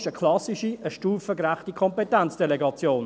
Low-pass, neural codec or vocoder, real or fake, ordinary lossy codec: none; none; real; none